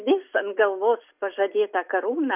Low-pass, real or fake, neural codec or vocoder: 3.6 kHz; real; none